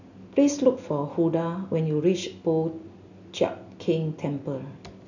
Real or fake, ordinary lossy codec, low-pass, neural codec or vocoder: real; none; 7.2 kHz; none